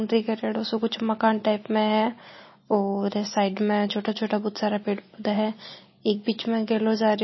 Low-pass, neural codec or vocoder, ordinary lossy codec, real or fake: 7.2 kHz; none; MP3, 24 kbps; real